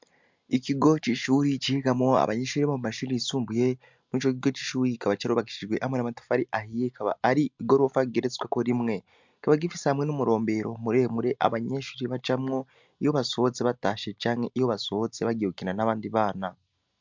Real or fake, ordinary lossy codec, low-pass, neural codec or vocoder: real; MP3, 64 kbps; 7.2 kHz; none